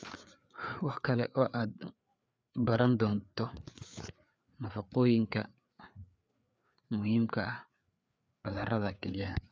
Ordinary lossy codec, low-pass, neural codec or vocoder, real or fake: none; none; codec, 16 kHz, 4 kbps, FreqCodec, larger model; fake